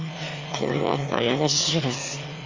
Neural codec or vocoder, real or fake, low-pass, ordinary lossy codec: autoencoder, 22.05 kHz, a latent of 192 numbers a frame, VITS, trained on one speaker; fake; 7.2 kHz; Opus, 32 kbps